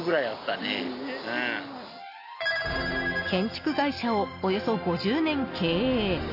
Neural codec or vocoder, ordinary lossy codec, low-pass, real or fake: none; AAC, 32 kbps; 5.4 kHz; real